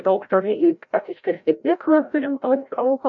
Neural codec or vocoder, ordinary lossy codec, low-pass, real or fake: codec, 16 kHz, 0.5 kbps, FreqCodec, larger model; MP3, 64 kbps; 7.2 kHz; fake